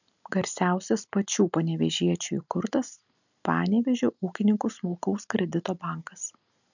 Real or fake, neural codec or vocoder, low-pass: real; none; 7.2 kHz